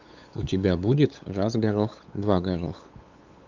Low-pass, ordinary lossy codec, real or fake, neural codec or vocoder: 7.2 kHz; Opus, 32 kbps; fake; codec, 16 kHz, 8 kbps, FunCodec, trained on LibriTTS, 25 frames a second